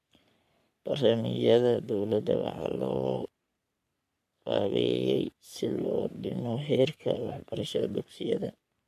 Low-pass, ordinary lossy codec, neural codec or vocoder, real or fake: 14.4 kHz; MP3, 96 kbps; codec, 44.1 kHz, 3.4 kbps, Pupu-Codec; fake